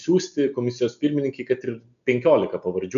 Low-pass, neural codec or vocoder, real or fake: 7.2 kHz; none; real